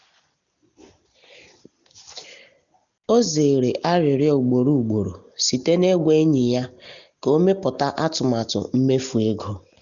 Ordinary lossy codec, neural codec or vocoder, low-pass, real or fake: none; none; 7.2 kHz; real